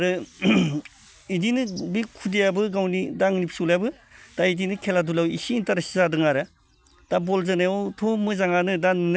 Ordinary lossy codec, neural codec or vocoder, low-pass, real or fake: none; none; none; real